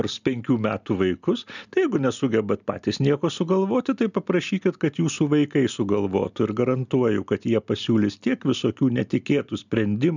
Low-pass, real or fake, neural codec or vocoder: 7.2 kHz; real; none